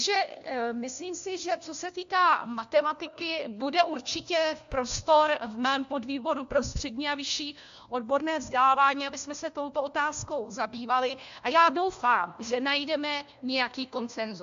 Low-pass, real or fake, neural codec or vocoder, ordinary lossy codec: 7.2 kHz; fake; codec, 16 kHz, 1 kbps, FunCodec, trained on LibriTTS, 50 frames a second; AAC, 64 kbps